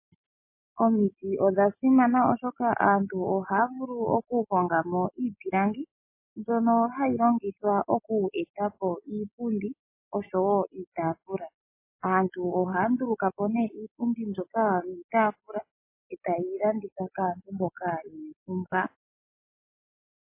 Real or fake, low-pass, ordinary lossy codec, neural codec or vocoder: real; 3.6 kHz; MP3, 24 kbps; none